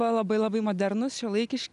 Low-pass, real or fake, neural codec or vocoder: 10.8 kHz; real; none